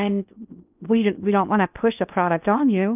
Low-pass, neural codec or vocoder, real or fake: 3.6 kHz; codec, 16 kHz in and 24 kHz out, 0.6 kbps, FocalCodec, streaming, 2048 codes; fake